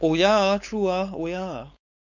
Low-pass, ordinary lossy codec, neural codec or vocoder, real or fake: 7.2 kHz; none; codec, 16 kHz, 4.8 kbps, FACodec; fake